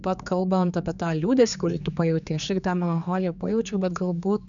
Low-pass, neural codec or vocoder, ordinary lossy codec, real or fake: 7.2 kHz; codec, 16 kHz, 4 kbps, X-Codec, HuBERT features, trained on general audio; MP3, 96 kbps; fake